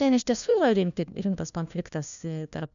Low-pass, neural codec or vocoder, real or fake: 7.2 kHz; codec, 16 kHz, 1 kbps, FunCodec, trained on LibriTTS, 50 frames a second; fake